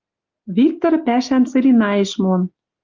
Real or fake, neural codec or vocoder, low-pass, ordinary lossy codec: real; none; 7.2 kHz; Opus, 32 kbps